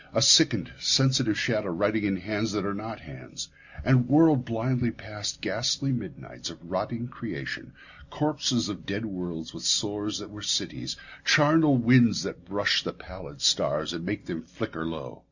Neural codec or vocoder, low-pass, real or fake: none; 7.2 kHz; real